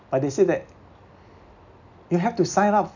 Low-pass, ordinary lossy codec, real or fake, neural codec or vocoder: 7.2 kHz; none; real; none